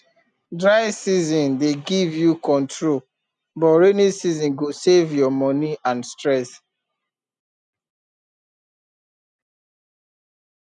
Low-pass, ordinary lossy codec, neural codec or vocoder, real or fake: 9.9 kHz; none; none; real